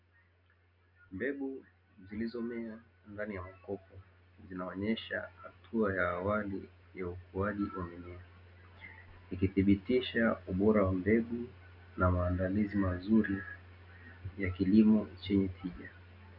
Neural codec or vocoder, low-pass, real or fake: none; 5.4 kHz; real